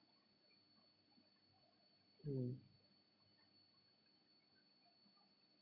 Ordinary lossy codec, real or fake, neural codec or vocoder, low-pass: none; fake; vocoder, 44.1 kHz, 128 mel bands every 256 samples, BigVGAN v2; 5.4 kHz